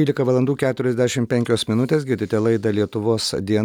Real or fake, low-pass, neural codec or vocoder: real; 19.8 kHz; none